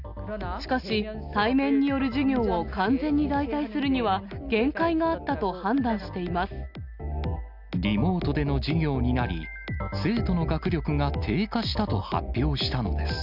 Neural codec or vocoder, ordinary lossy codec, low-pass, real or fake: none; none; 5.4 kHz; real